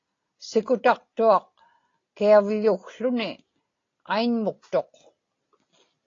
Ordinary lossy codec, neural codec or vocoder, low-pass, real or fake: AAC, 32 kbps; none; 7.2 kHz; real